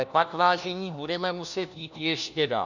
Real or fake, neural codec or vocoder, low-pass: fake; codec, 16 kHz, 1 kbps, FunCodec, trained on LibriTTS, 50 frames a second; 7.2 kHz